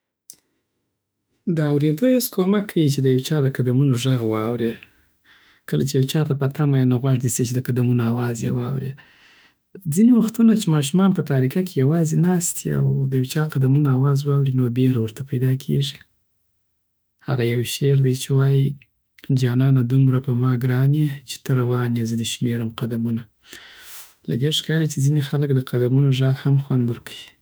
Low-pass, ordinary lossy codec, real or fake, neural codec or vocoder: none; none; fake; autoencoder, 48 kHz, 32 numbers a frame, DAC-VAE, trained on Japanese speech